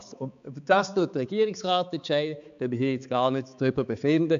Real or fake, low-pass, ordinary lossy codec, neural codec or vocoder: fake; 7.2 kHz; AAC, 64 kbps; codec, 16 kHz, 4 kbps, X-Codec, HuBERT features, trained on balanced general audio